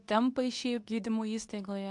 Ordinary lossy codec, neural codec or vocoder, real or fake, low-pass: AAC, 64 kbps; codec, 24 kHz, 0.9 kbps, WavTokenizer, medium speech release version 2; fake; 10.8 kHz